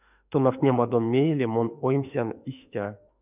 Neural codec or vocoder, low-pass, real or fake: autoencoder, 48 kHz, 32 numbers a frame, DAC-VAE, trained on Japanese speech; 3.6 kHz; fake